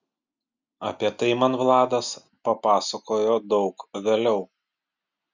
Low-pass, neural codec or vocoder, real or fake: 7.2 kHz; none; real